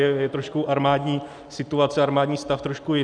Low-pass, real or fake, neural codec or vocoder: 9.9 kHz; real; none